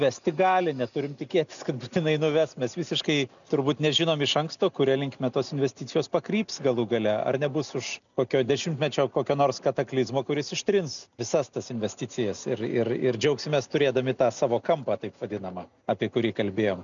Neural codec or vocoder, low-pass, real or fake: none; 7.2 kHz; real